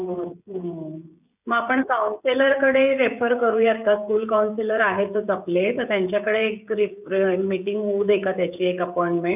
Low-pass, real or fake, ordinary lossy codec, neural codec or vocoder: 3.6 kHz; fake; none; codec, 16 kHz, 16 kbps, FreqCodec, smaller model